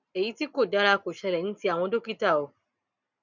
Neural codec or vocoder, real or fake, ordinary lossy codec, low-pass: none; real; none; 7.2 kHz